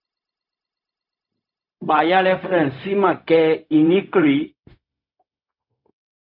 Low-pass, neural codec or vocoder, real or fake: 5.4 kHz; codec, 16 kHz, 0.4 kbps, LongCat-Audio-Codec; fake